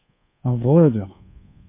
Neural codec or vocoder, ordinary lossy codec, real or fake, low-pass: codec, 24 kHz, 1.2 kbps, DualCodec; MP3, 24 kbps; fake; 3.6 kHz